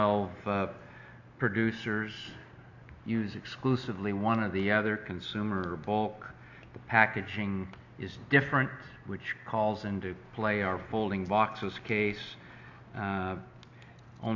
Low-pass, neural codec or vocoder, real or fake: 7.2 kHz; none; real